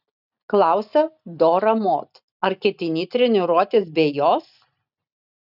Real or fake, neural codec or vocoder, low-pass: real; none; 5.4 kHz